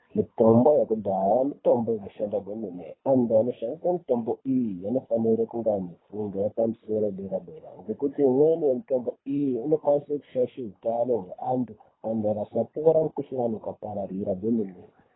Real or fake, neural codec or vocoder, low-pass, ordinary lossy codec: fake; codec, 24 kHz, 3 kbps, HILCodec; 7.2 kHz; AAC, 16 kbps